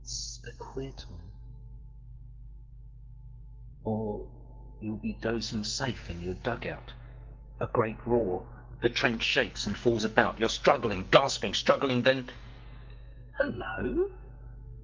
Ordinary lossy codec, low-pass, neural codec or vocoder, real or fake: Opus, 24 kbps; 7.2 kHz; codec, 44.1 kHz, 2.6 kbps, SNAC; fake